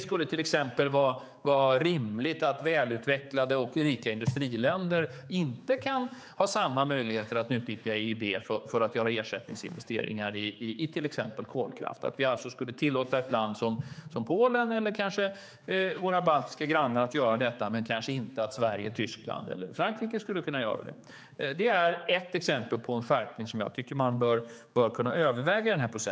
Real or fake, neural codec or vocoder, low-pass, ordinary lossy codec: fake; codec, 16 kHz, 4 kbps, X-Codec, HuBERT features, trained on general audio; none; none